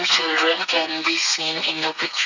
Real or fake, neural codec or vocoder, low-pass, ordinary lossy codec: fake; codec, 32 kHz, 1.9 kbps, SNAC; 7.2 kHz; none